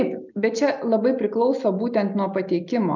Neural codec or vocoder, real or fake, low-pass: none; real; 7.2 kHz